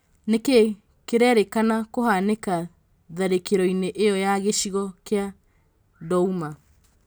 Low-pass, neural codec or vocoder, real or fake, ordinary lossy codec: none; none; real; none